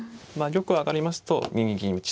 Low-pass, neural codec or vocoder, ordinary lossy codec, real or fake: none; none; none; real